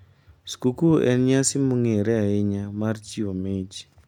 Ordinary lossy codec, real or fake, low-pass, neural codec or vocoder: none; real; 19.8 kHz; none